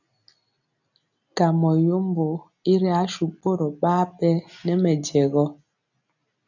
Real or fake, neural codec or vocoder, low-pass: real; none; 7.2 kHz